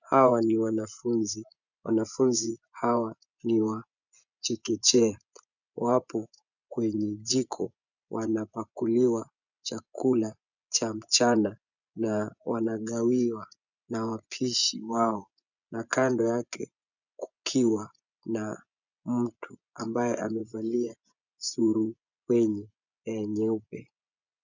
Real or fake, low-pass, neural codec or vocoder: fake; 7.2 kHz; vocoder, 24 kHz, 100 mel bands, Vocos